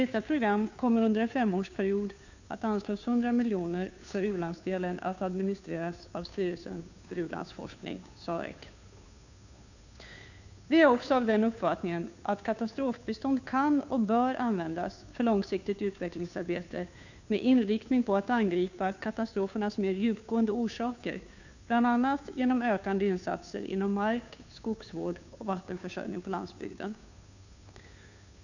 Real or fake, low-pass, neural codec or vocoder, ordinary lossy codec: fake; 7.2 kHz; codec, 16 kHz, 2 kbps, FunCodec, trained on Chinese and English, 25 frames a second; none